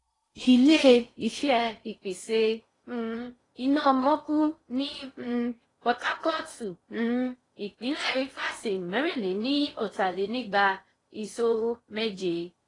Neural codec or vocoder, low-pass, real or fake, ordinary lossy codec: codec, 16 kHz in and 24 kHz out, 0.6 kbps, FocalCodec, streaming, 4096 codes; 10.8 kHz; fake; AAC, 32 kbps